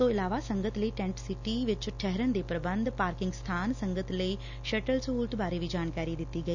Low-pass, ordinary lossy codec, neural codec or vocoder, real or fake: 7.2 kHz; none; none; real